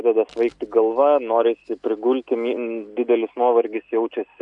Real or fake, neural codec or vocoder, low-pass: real; none; 10.8 kHz